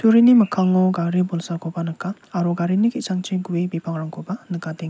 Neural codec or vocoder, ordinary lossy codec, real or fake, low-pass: none; none; real; none